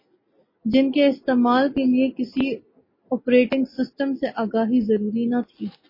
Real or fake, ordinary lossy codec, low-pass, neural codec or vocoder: real; MP3, 24 kbps; 5.4 kHz; none